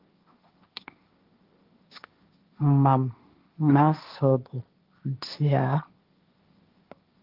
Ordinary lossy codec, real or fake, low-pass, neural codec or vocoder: Opus, 32 kbps; fake; 5.4 kHz; codec, 16 kHz, 1.1 kbps, Voila-Tokenizer